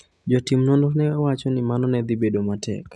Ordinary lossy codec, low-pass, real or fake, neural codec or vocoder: none; none; real; none